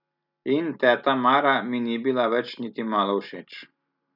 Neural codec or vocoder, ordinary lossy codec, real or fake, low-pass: none; none; real; 5.4 kHz